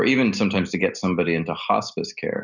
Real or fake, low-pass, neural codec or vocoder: real; 7.2 kHz; none